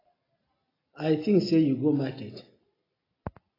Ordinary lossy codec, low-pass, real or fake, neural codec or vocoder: AAC, 24 kbps; 5.4 kHz; real; none